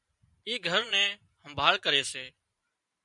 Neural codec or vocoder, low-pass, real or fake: vocoder, 44.1 kHz, 128 mel bands every 512 samples, BigVGAN v2; 10.8 kHz; fake